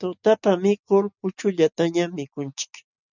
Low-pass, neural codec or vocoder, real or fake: 7.2 kHz; none; real